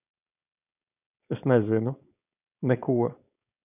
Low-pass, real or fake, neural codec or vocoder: 3.6 kHz; fake; codec, 16 kHz, 4.8 kbps, FACodec